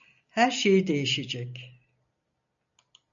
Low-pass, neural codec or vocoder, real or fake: 7.2 kHz; none; real